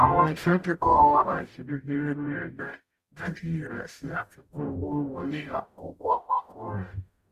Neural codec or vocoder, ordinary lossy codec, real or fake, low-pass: codec, 44.1 kHz, 0.9 kbps, DAC; Opus, 64 kbps; fake; 14.4 kHz